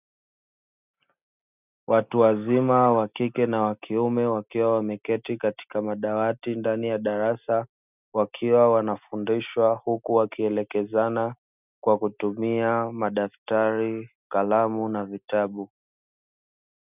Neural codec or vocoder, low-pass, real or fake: none; 3.6 kHz; real